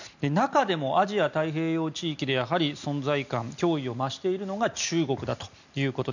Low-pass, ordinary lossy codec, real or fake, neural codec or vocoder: 7.2 kHz; none; real; none